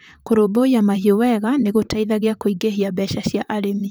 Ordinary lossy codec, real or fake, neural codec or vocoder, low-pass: none; fake; vocoder, 44.1 kHz, 128 mel bands, Pupu-Vocoder; none